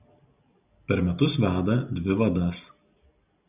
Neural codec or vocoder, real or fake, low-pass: none; real; 3.6 kHz